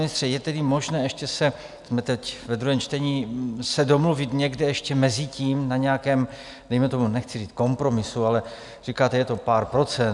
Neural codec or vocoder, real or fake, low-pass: none; real; 10.8 kHz